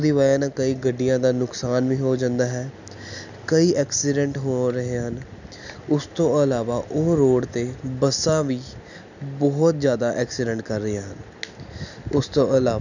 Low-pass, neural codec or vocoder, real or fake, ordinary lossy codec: 7.2 kHz; none; real; none